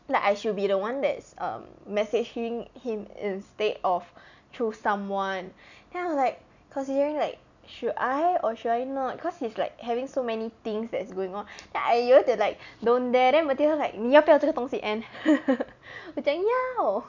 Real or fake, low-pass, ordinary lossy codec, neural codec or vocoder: real; 7.2 kHz; none; none